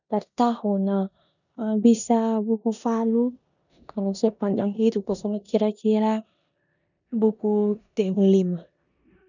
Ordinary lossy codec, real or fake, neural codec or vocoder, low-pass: none; fake; codec, 16 kHz in and 24 kHz out, 0.9 kbps, LongCat-Audio-Codec, four codebook decoder; 7.2 kHz